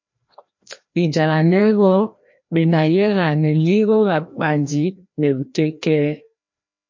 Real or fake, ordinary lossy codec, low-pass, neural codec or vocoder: fake; MP3, 48 kbps; 7.2 kHz; codec, 16 kHz, 1 kbps, FreqCodec, larger model